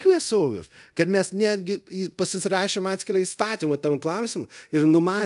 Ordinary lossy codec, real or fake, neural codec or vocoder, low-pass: AAC, 96 kbps; fake; codec, 24 kHz, 0.5 kbps, DualCodec; 10.8 kHz